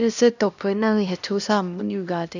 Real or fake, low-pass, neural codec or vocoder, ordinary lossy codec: fake; 7.2 kHz; codec, 16 kHz, 1 kbps, X-Codec, HuBERT features, trained on LibriSpeech; none